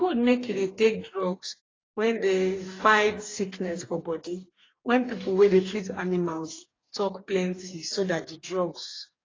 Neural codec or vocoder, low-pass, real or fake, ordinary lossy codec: codec, 44.1 kHz, 2.6 kbps, DAC; 7.2 kHz; fake; AAC, 32 kbps